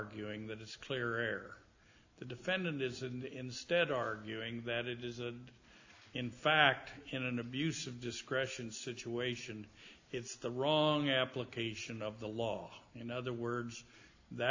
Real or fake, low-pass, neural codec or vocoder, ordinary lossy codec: real; 7.2 kHz; none; AAC, 48 kbps